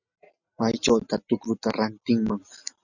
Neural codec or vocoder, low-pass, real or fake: none; 7.2 kHz; real